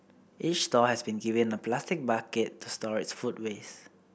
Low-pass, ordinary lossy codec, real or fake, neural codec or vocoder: none; none; real; none